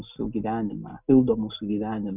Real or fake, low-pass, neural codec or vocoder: real; 3.6 kHz; none